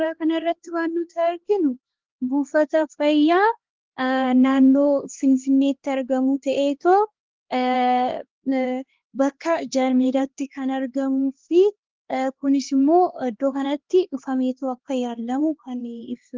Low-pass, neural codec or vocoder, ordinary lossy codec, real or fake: 7.2 kHz; codec, 16 kHz, 2 kbps, X-Codec, HuBERT features, trained on LibriSpeech; Opus, 16 kbps; fake